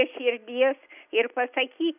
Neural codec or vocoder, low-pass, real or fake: none; 3.6 kHz; real